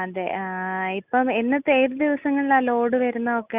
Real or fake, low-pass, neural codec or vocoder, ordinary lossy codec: real; 3.6 kHz; none; Opus, 64 kbps